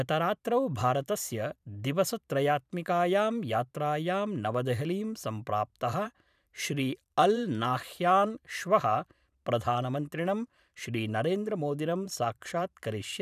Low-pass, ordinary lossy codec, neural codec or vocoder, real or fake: 14.4 kHz; none; vocoder, 44.1 kHz, 128 mel bands every 512 samples, BigVGAN v2; fake